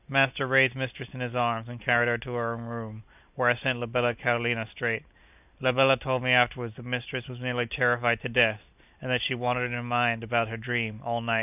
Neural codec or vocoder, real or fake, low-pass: none; real; 3.6 kHz